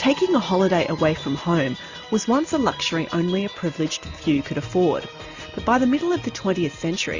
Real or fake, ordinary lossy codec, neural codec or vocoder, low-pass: real; Opus, 64 kbps; none; 7.2 kHz